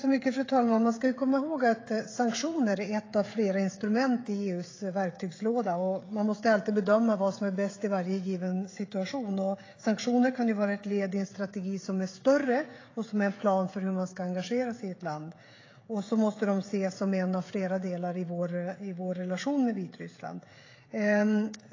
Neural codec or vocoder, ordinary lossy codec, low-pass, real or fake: codec, 16 kHz, 16 kbps, FreqCodec, smaller model; AAC, 32 kbps; 7.2 kHz; fake